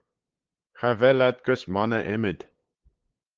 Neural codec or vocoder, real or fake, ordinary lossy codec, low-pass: codec, 16 kHz, 8 kbps, FunCodec, trained on LibriTTS, 25 frames a second; fake; Opus, 16 kbps; 7.2 kHz